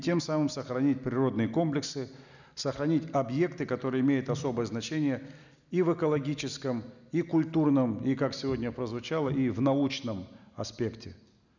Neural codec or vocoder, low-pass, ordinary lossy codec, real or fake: none; 7.2 kHz; none; real